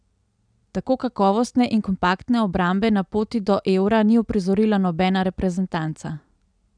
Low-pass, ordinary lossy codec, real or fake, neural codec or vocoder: 9.9 kHz; none; real; none